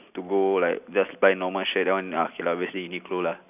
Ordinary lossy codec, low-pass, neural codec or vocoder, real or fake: none; 3.6 kHz; none; real